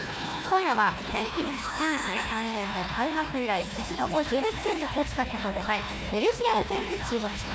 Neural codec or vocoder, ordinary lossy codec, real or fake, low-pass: codec, 16 kHz, 1 kbps, FunCodec, trained on Chinese and English, 50 frames a second; none; fake; none